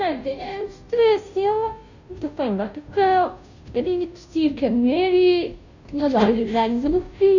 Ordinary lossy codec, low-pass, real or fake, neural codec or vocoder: none; 7.2 kHz; fake; codec, 16 kHz, 0.5 kbps, FunCodec, trained on Chinese and English, 25 frames a second